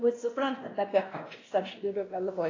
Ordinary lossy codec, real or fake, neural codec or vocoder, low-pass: AAC, 32 kbps; fake; codec, 16 kHz, 1 kbps, X-Codec, WavLM features, trained on Multilingual LibriSpeech; 7.2 kHz